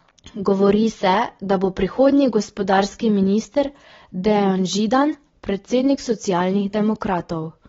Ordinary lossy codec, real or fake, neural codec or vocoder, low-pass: AAC, 24 kbps; real; none; 7.2 kHz